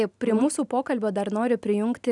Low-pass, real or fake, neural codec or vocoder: 10.8 kHz; real; none